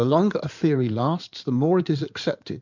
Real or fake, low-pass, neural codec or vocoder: fake; 7.2 kHz; codec, 16 kHz in and 24 kHz out, 2.2 kbps, FireRedTTS-2 codec